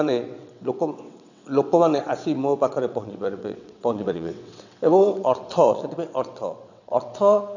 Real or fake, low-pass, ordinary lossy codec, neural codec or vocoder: fake; 7.2 kHz; none; vocoder, 22.05 kHz, 80 mel bands, WaveNeXt